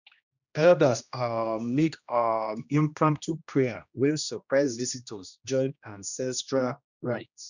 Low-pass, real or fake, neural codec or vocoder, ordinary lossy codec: 7.2 kHz; fake; codec, 16 kHz, 1 kbps, X-Codec, HuBERT features, trained on general audio; none